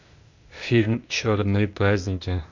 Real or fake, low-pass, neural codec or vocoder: fake; 7.2 kHz; codec, 16 kHz, 0.8 kbps, ZipCodec